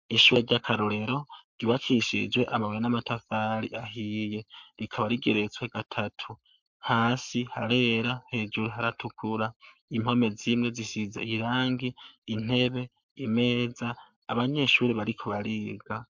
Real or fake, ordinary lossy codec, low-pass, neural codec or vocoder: fake; MP3, 64 kbps; 7.2 kHz; codec, 44.1 kHz, 7.8 kbps, Pupu-Codec